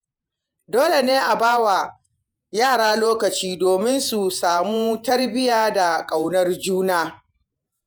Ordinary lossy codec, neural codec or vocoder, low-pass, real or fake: none; vocoder, 48 kHz, 128 mel bands, Vocos; none; fake